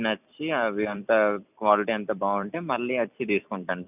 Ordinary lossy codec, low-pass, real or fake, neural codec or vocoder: none; 3.6 kHz; fake; vocoder, 44.1 kHz, 128 mel bands every 256 samples, BigVGAN v2